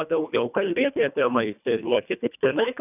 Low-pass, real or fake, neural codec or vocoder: 3.6 kHz; fake; codec, 24 kHz, 1.5 kbps, HILCodec